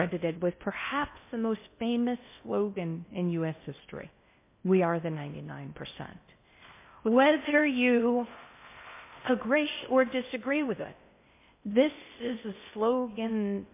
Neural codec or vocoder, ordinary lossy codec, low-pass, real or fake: codec, 16 kHz in and 24 kHz out, 0.6 kbps, FocalCodec, streaming, 2048 codes; MP3, 24 kbps; 3.6 kHz; fake